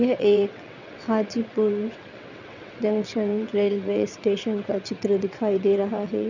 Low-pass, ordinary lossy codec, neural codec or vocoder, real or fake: 7.2 kHz; none; vocoder, 22.05 kHz, 80 mel bands, WaveNeXt; fake